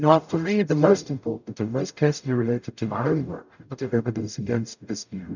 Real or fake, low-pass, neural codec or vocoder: fake; 7.2 kHz; codec, 44.1 kHz, 0.9 kbps, DAC